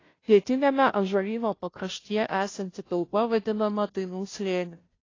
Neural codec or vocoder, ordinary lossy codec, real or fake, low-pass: codec, 16 kHz, 0.5 kbps, FunCodec, trained on Chinese and English, 25 frames a second; AAC, 32 kbps; fake; 7.2 kHz